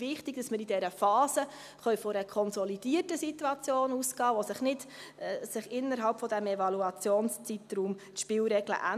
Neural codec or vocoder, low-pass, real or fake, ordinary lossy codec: none; 14.4 kHz; real; none